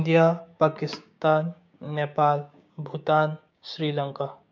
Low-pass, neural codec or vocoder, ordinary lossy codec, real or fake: 7.2 kHz; codec, 16 kHz, 6 kbps, DAC; MP3, 64 kbps; fake